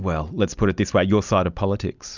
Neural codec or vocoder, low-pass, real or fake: none; 7.2 kHz; real